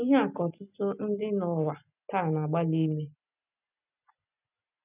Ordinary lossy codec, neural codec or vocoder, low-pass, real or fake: none; none; 3.6 kHz; real